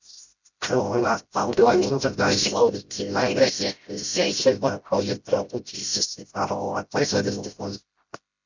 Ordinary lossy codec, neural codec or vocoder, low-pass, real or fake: Opus, 64 kbps; codec, 16 kHz, 0.5 kbps, FreqCodec, smaller model; 7.2 kHz; fake